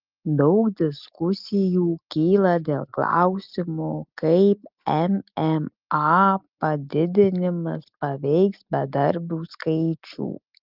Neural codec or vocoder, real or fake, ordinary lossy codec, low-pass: none; real; Opus, 24 kbps; 5.4 kHz